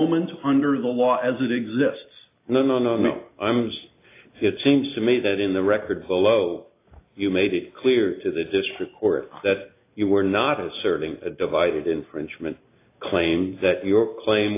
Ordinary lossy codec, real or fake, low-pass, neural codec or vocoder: AAC, 24 kbps; real; 3.6 kHz; none